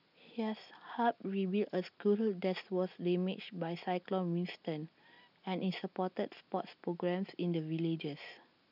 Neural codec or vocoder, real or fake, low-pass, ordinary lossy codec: none; real; 5.4 kHz; none